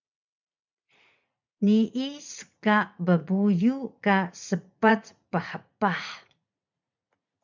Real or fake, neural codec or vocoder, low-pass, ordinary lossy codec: fake; vocoder, 44.1 kHz, 80 mel bands, Vocos; 7.2 kHz; MP3, 64 kbps